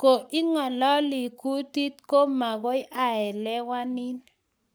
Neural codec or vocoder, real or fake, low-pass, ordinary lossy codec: codec, 44.1 kHz, 7.8 kbps, Pupu-Codec; fake; none; none